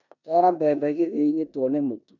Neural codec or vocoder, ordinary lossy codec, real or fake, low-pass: codec, 16 kHz in and 24 kHz out, 0.9 kbps, LongCat-Audio-Codec, four codebook decoder; none; fake; 7.2 kHz